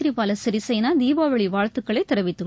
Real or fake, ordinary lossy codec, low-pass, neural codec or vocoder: real; none; none; none